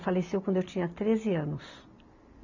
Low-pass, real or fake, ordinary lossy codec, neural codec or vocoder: 7.2 kHz; real; none; none